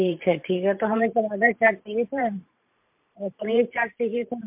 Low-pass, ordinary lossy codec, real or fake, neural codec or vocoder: 3.6 kHz; MP3, 32 kbps; fake; vocoder, 44.1 kHz, 128 mel bands every 512 samples, BigVGAN v2